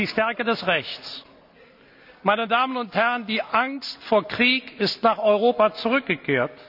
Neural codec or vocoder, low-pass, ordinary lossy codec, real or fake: none; 5.4 kHz; none; real